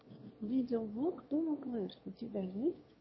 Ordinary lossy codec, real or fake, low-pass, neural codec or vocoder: MP3, 24 kbps; fake; 7.2 kHz; autoencoder, 22.05 kHz, a latent of 192 numbers a frame, VITS, trained on one speaker